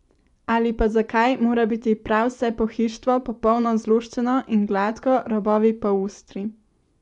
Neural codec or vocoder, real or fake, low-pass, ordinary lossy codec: vocoder, 24 kHz, 100 mel bands, Vocos; fake; 10.8 kHz; none